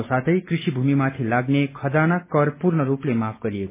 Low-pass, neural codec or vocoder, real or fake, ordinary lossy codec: 3.6 kHz; none; real; MP3, 16 kbps